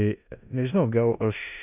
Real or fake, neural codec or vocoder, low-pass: fake; codec, 16 kHz in and 24 kHz out, 0.9 kbps, LongCat-Audio-Codec, four codebook decoder; 3.6 kHz